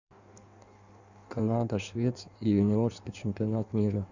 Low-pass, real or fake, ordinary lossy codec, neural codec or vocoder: 7.2 kHz; fake; none; codec, 16 kHz in and 24 kHz out, 1.1 kbps, FireRedTTS-2 codec